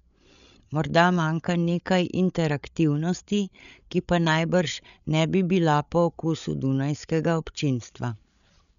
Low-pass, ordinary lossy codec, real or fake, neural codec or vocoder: 7.2 kHz; none; fake; codec, 16 kHz, 8 kbps, FreqCodec, larger model